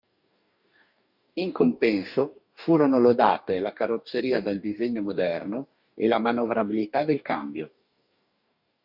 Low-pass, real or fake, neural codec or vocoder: 5.4 kHz; fake; codec, 44.1 kHz, 2.6 kbps, DAC